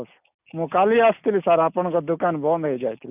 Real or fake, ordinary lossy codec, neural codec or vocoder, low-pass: real; none; none; 3.6 kHz